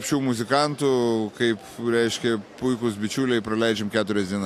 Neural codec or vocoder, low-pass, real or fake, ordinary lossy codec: none; 14.4 kHz; real; AAC, 64 kbps